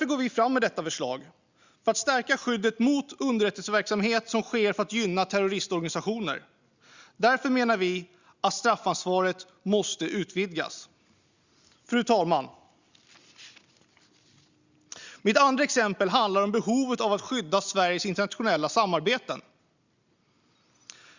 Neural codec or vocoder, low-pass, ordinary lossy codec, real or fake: none; 7.2 kHz; Opus, 64 kbps; real